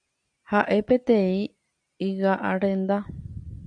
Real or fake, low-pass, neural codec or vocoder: real; 9.9 kHz; none